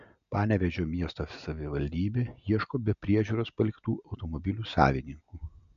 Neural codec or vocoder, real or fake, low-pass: none; real; 7.2 kHz